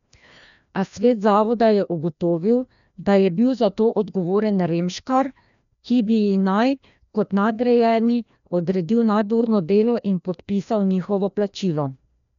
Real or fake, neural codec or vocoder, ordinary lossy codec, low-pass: fake; codec, 16 kHz, 1 kbps, FreqCodec, larger model; none; 7.2 kHz